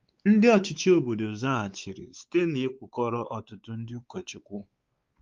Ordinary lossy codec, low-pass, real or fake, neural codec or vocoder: Opus, 32 kbps; 7.2 kHz; fake; codec, 16 kHz, 4 kbps, X-Codec, WavLM features, trained on Multilingual LibriSpeech